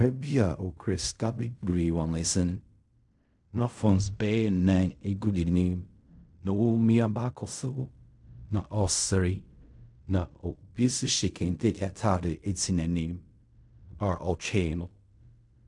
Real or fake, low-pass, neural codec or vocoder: fake; 10.8 kHz; codec, 16 kHz in and 24 kHz out, 0.4 kbps, LongCat-Audio-Codec, fine tuned four codebook decoder